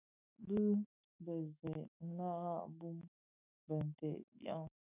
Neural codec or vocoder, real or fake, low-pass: autoencoder, 48 kHz, 128 numbers a frame, DAC-VAE, trained on Japanese speech; fake; 3.6 kHz